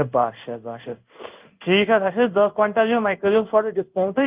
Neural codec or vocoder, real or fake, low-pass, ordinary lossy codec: codec, 16 kHz in and 24 kHz out, 1 kbps, XY-Tokenizer; fake; 3.6 kHz; Opus, 24 kbps